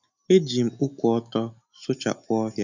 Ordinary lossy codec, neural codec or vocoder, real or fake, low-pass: none; none; real; 7.2 kHz